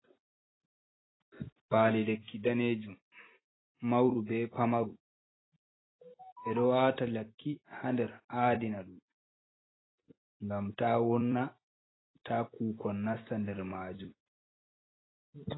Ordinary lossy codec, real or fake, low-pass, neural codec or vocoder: AAC, 16 kbps; fake; 7.2 kHz; vocoder, 44.1 kHz, 128 mel bands every 256 samples, BigVGAN v2